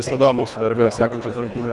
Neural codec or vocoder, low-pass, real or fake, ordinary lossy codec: codec, 24 kHz, 1.5 kbps, HILCodec; 10.8 kHz; fake; Opus, 64 kbps